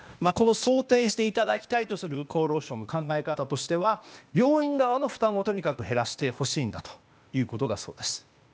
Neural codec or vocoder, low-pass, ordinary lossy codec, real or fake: codec, 16 kHz, 0.8 kbps, ZipCodec; none; none; fake